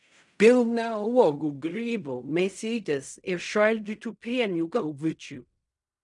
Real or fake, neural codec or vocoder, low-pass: fake; codec, 16 kHz in and 24 kHz out, 0.4 kbps, LongCat-Audio-Codec, fine tuned four codebook decoder; 10.8 kHz